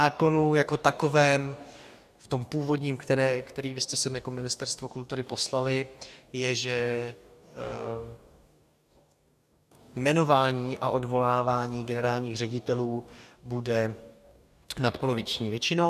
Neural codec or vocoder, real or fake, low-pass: codec, 44.1 kHz, 2.6 kbps, DAC; fake; 14.4 kHz